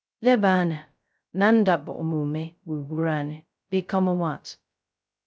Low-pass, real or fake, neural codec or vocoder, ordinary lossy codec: none; fake; codec, 16 kHz, 0.2 kbps, FocalCodec; none